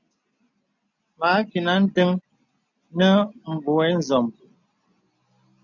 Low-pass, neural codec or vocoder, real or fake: 7.2 kHz; none; real